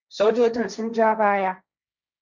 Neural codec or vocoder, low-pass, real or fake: codec, 16 kHz, 1.1 kbps, Voila-Tokenizer; 7.2 kHz; fake